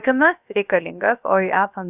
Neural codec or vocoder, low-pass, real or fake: codec, 16 kHz, about 1 kbps, DyCAST, with the encoder's durations; 3.6 kHz; fake